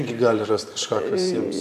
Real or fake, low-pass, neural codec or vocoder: fake; 14.4 kHz; vocoder, 44.1 kHz, 128 mel bands every 512 samples, BigVGAN v2